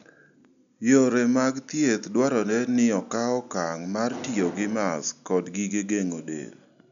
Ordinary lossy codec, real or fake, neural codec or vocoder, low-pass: none; real; none; 7.2 kHz